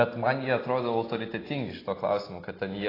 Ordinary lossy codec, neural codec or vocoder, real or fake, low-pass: AAC, 24 kbps; none; real; 5.4 kHz